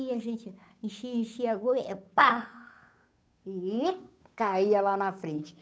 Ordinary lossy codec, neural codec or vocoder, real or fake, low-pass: none; codec, 16 kHz, 8 kbps, FunCodec, trained on Chinese and English, 25 frames a second; fake; none